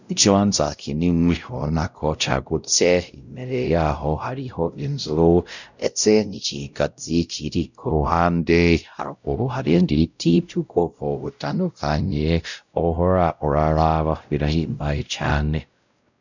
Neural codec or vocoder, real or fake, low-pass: codec, 16 kHz, 0.5 kbps, X-Codec, WavLM features, trained on Multilingual LibriSpeech; fake; 7.2 kHz